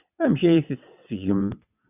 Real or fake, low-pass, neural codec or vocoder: fake; 3.6 kHz; vocoder, 22.05 kHz, 80 mel bands, WaveNeXt